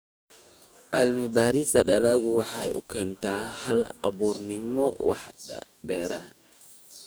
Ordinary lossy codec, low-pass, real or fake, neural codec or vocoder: none; none; fake; codec, 44.1 kHz, 2.6 kbps, DAC